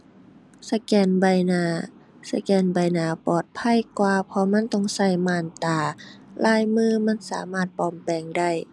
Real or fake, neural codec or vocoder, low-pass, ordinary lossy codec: real; none; none; none